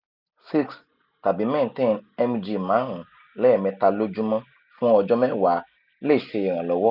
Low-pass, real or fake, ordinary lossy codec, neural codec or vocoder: 5.4 kHz; real; none; none